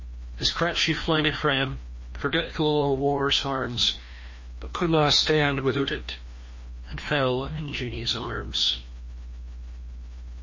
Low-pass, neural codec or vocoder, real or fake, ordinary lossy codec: 7.2 kHz; codec, 16 kHz, 1 kbps, FreqCodec, larger model; fake; MP3, 32 kbps